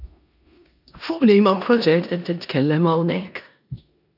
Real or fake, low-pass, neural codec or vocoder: fake; 5.4 kHz; codec, 16 kHz in and 24 kHz out, 0.9 kbps, LongCat-Audio-Codec, four codebook decoder